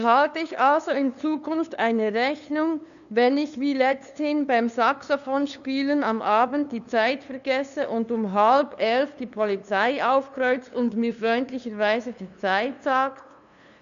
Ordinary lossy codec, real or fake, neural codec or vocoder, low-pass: none; fake; codec, 16 kHz, 2 kbps, FunCodec, trained on LibriTTS, 25 frames a second; 7.2 kHz